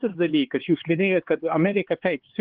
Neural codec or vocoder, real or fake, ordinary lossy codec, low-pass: codec, 16 kHz, 4 kbps, X-Codec, HuBERT features, trained on LibriSpeech; fake; Opus, 16 kbps; 5.4 kHz